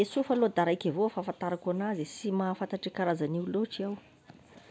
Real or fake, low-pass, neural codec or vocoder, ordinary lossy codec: real; none; none; none